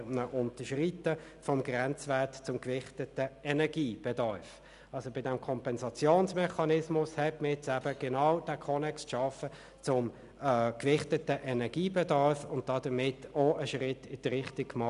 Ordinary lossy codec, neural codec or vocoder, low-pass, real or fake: none; none; 10.8 kHz; real